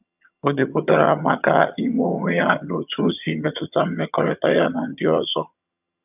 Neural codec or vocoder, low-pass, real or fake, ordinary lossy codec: vocoder, 22.05 kHz, 80 mel bands, HiFi-GAN; 3.6 kHz; fake; none